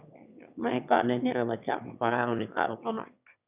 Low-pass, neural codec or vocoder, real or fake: 3.6 kHz; autoencoder, 22.05 kHz, a latent of 192 numbers a frame, VITS, trained on one speaker; fake